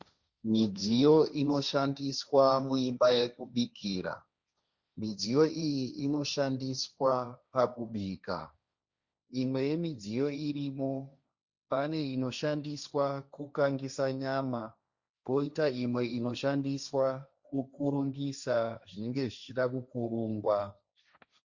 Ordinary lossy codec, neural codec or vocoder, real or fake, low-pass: Opus, 64 kbps; codec, 16 kHz, 1.1 kbps, Voila-Tokenizer; fake; 7.2 kHz